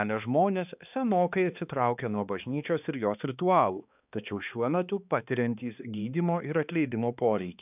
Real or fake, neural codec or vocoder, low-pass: fake; codec, 16 kHz, 2 kbps, X-Codec, HuBERT features, trained on balanced general audio; 3.6 kHz